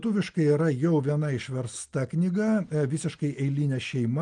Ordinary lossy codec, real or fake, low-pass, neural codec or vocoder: Opus, 32 kbps; real; 9.9 kHz; none